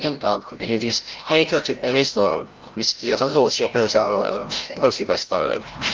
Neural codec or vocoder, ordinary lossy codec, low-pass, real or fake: codec, 16 kHz, 0.5 kbps, FreqCodec, larger model; Opus, 32 kbps; 7.2 kHz; fake